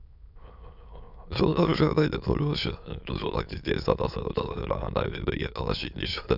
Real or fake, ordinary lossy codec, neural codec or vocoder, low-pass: fake; none; autoencoder, 22.05 kHz, a latent of 192 numbers a frame, VITS, trained on many speakers; 5.4 kHz